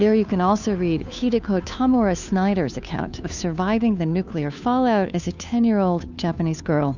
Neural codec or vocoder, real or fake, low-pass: codec, 16 kHz, 2 kbps, FunCodec, trained on Chinese and English, 25 frames a second; fake; 7.2 kHz